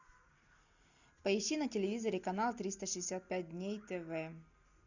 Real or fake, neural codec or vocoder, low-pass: real; none; 7.2 kHz